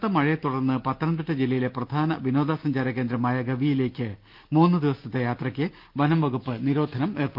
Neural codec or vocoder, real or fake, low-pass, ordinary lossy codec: none; real; 5.4 kHz; Opus, 32 kbps